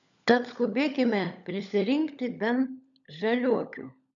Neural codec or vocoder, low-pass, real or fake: codec, 16 kHz, 16 kbps, FunCodec, trained on LibriTTS, 50 frames a second; 7.2 kHz; fake